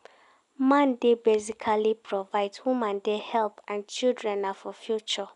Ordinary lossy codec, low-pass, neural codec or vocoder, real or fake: none; 10.8 kHz; none; real